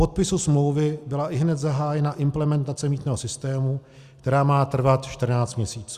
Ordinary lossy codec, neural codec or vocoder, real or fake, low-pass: Opus, 64 kbps; none; real; 14.4 kHz